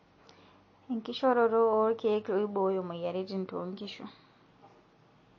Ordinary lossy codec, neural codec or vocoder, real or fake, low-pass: MP3, 32 kbps; none; real; 7.2 kHz